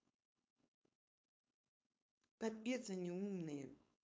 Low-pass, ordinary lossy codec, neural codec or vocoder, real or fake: none; none; codec, 16 kHz, 4.8 kbps, FACodec; fake